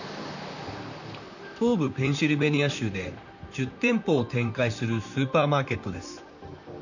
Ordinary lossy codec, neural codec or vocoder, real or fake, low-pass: none; vocoder, 44.1 kHz, 128 mel bands, Pupu-Vocoder; fake; 7.2 kHz